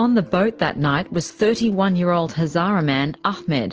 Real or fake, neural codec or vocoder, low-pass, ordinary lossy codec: real; none; 7.2 kHz; Opus, 16 kbps